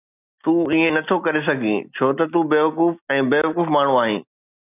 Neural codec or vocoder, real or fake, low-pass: none; real; 3.6 kHz